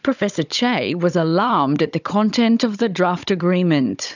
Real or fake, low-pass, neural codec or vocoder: fake; 7.2 kHz; codec, 16 kHz, 16 kbps, FunCodec, trained on Chinese and English, 50 frames a second